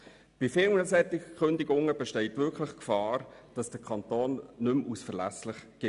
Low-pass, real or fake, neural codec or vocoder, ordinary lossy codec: 10.8 kHz; real; none; none